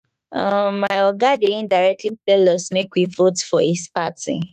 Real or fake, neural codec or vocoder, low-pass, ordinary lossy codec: fake; autoencoder, 48 kHz, 32 numbers a frame, DAC-VAE, trained on Japanese speech; 14.4 kHz; none